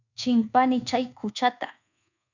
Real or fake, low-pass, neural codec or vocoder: fake; 7.2 kHz; codec, 24 kHz, 1.2 kbps, DualCodec